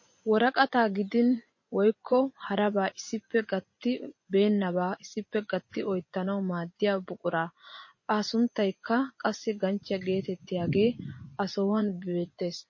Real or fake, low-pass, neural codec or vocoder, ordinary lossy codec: fake; 7.2 kHz; vocoder, 24 kHz, 100 mel bands, Vocos; MP3, 32 kbps